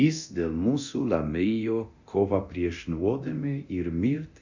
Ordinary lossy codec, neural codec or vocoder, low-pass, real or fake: Opus, 64 kbps; codec, 24 kHz, 0.9 kbps, DualCodec; 7.2 kHz; fake